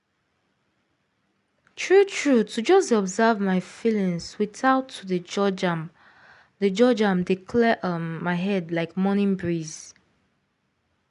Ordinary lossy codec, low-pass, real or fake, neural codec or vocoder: none; 10.8 kHz; real; none